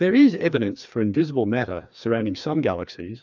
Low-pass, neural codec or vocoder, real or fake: 7.2 kHz; codec, 16 kHz, 2 kbps, FreqCodec, larger model; fake